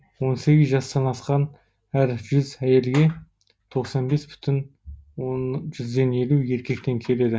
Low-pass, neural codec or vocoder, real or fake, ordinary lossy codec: none; none; real; none